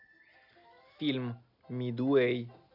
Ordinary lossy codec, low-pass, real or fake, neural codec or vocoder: none; 5.4 kHz; real; none